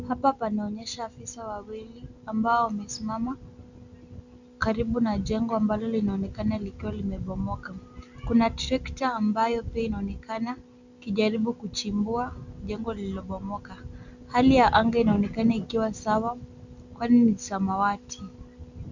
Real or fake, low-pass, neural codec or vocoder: real; 7.2 kHz; none